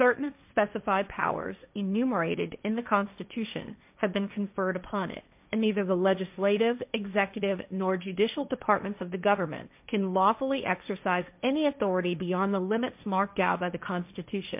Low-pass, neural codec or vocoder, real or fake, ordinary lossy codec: 3.6 kHz; codec, 16 kHz, 1.1 kbps, Voila-Tokenizer; fake; MP3, 32 kbps